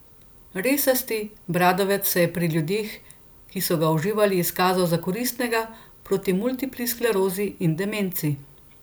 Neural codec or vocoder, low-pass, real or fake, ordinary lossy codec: none; none; real; none